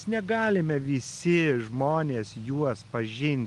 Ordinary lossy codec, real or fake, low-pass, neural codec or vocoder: Opus, 24 kbps; real; 10.8 kHz; none